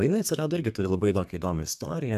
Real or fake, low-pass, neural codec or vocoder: fake; 14.4 kHz; codec, 32 kHz, 1.9 kbps, SNAC